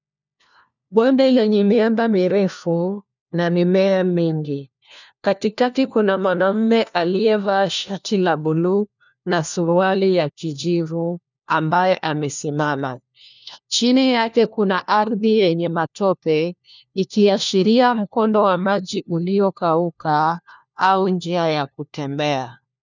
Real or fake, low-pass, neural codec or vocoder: fake; 7.2 kHz; codec, 16 kHz, 1 kbps, FunCodec, trained on LibriTTS, 50 frames a second